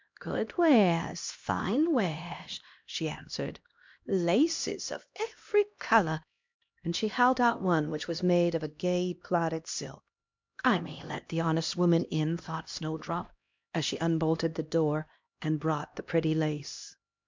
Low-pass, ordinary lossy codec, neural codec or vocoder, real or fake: 7.2 kHz; MP3, 64 kbps; codec, 16 kHz, 1 kbps, X-Codec, HuBERT features, trained on LibriSpeech; fake